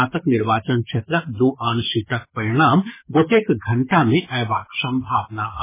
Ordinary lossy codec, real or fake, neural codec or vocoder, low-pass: MP3, 16 kbps; fake; codec, 24 kHz, 6 kbps, HILCodec; 3.6 kHz